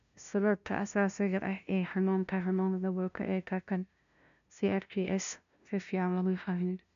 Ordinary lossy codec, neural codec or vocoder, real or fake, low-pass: none; codec, 16 kHz, 0.5 kbps, FunCodec, trained on LibriTTS, 25 frames a second; fake; 7.2 kHz